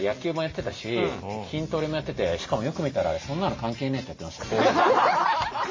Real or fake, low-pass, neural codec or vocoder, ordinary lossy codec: real; 7.2 kHz; none; MP3, 32 kbps